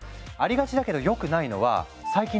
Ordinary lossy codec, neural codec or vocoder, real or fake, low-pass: none; none; real; none